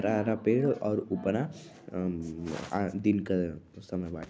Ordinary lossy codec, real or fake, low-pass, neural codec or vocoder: none; real; none; none